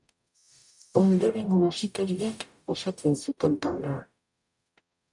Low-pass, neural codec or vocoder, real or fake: 10.8 kHz; codec, 44.1 kHz, 0.9 kbps, DAC; fake